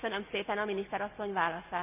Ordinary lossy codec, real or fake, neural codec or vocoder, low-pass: none; real; none; 3.6 kHz